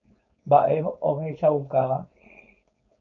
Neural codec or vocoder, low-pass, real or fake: codec, 16 kHz, 4.8 kbps, FACodec; 7.2 kHz; fake